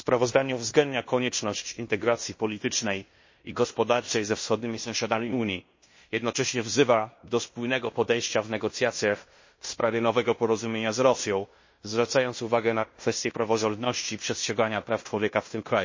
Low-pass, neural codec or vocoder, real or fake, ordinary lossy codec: 7.2 kHz; codec, 16 kHz in and 24 kHz out, 0.9 kbps, LongCat-Audio-Codec, fine tuned four codebook decoder; fake; MP3, 32 kbps